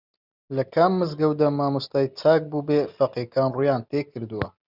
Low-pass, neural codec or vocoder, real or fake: 5.4 kHz; none; real